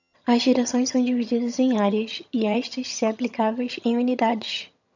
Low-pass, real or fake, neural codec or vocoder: 7.2 kHz; fake; vocoder, 22.05 kHz, 80 mel bands, HiFi-GAN